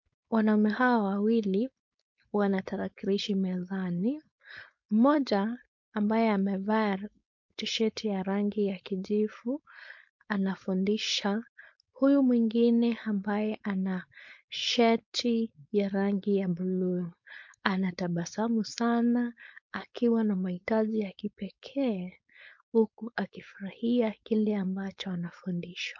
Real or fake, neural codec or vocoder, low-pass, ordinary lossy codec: fake; codec, 16 kHz, 4.8 kbps, FACodec; 7.2 kHz; MP3, 48 kbps